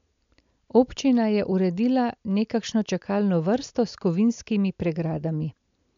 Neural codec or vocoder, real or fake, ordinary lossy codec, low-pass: none; real; MP3, 64 kbps; 7.2 kHz